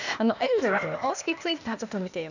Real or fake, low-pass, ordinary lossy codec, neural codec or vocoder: fake; 7.2 kHz; none; codec, 16 kHz, 0.8 kbps, ZipCodec